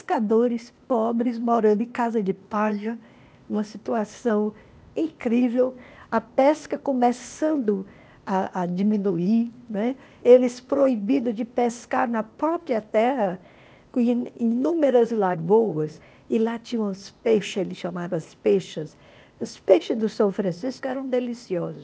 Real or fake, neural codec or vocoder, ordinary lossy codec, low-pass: fake; codec, 16 kHz, 0.8 kbps, ZipCodec; none; none